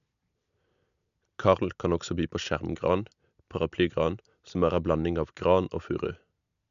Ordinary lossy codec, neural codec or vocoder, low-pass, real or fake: none; none; 7.2 kHz; real